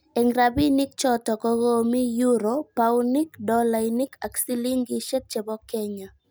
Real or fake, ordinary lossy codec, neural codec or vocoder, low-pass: real; none; none; none